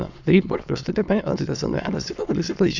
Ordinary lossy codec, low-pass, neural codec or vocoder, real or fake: none; 7.2 kHz; autoencoder, 22.05 kHz, a latent of 192 numbers a frame, VITS, trained on many speakers; fake